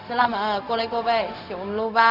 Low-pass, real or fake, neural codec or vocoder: 5.4 kHz; fake; codec, 16 kHz, 0.4 kbps, LongCat-Audio-Codec